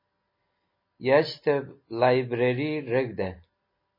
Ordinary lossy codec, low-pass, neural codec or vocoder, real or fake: MP3, 24 kbps; 5.4 kHz; none; real